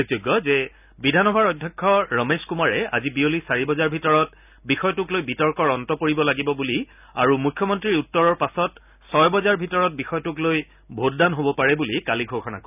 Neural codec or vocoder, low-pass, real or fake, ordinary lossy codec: none; 3.6 kHz; real; none